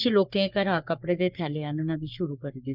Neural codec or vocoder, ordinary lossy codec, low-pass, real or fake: codec, 44.1 kHz, 3.4 kbps, Pupu-Codec; none; 5.4 kHz; fake